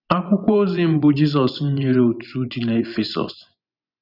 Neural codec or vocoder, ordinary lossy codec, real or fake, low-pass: vocoder, 24 kHz, 100 mel bands, Vocos; none; fake; 5.4 kHz